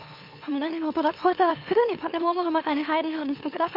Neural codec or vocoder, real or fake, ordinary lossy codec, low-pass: autoencoder, 44.1 kHz, a latent of 192 numbers a frame, MeloTTS; fake; MP3, 32 kbps; 5.4 kHz